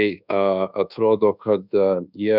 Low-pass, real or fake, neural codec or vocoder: 5.4 kHz; fake; codec, 24 kHz, 1.2 kbps, DualCodec